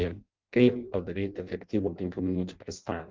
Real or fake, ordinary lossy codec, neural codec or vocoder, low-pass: fake; Opus, 32 kbps; codec, 16 kHz in and 24 kHz out, 0.6 kbps, FireRedTTS-2 codec; 7.2 kHz